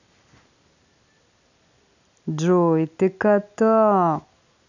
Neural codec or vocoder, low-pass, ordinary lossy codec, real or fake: none; 7.2 kHz; none; real